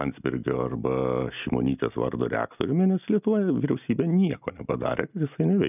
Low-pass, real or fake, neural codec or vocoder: 3.6 kHz; real; none